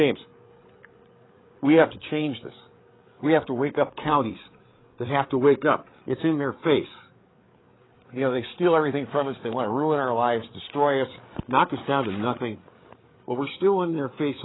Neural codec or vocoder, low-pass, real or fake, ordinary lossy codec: codec, 16 kHz, 8 kbps, FreqCodec, larger model; 7.2 kHz; fake; AAC, 16 kbps